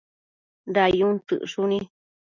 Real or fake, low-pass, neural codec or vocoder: real; 7.2 kHz; none